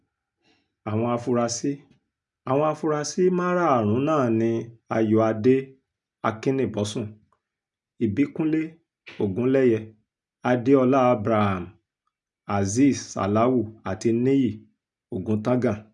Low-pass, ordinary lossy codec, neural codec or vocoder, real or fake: 10.8 kHz; none; none; real